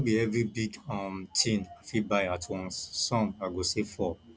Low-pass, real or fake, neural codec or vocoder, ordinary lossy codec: none; real; none; none